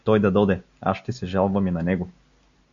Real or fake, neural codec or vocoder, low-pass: real; none; 7.2 kHz